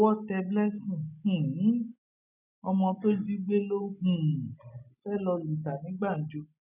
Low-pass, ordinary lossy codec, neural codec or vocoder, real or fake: 3.6 kHz; none; none; real